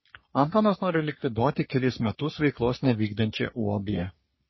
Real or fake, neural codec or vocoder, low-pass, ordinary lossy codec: fake; codec, 44.1 kHz, 3.4 kbps, Pupu-Codec; 7.2 kHz; MP3, 24 kbps